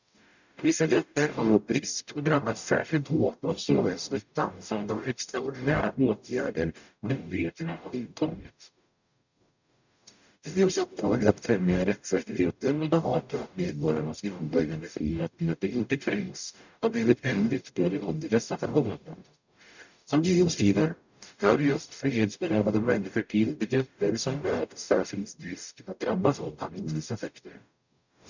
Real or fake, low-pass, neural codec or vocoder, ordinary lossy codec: fake; 7.2 kHz; codec, 44.1 kHz, 0.9 kbps, DAC; none